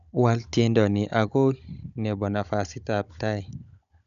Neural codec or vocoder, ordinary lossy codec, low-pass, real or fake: codec, 16 kHz, 16 kbps, FunCodec, trained on Chinese and English, 50 frames a second; none; 7.2 kHz; fake